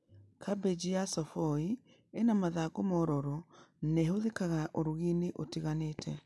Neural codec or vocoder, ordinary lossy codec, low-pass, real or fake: none; none; none; real